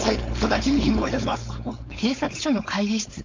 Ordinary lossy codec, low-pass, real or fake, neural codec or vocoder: MP3, 48 kbps; 7.2 kHz; fake; codec, 16 kHz, 4.8 kbps, FACodec